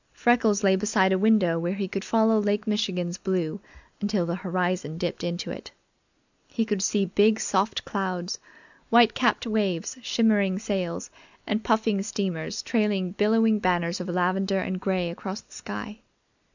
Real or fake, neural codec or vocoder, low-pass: real; none; 7.2 kHz